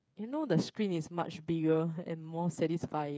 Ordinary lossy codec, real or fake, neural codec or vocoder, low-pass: none; fake; codec, 16 kHz, 8 kbps, FreqCodec, smaller model; none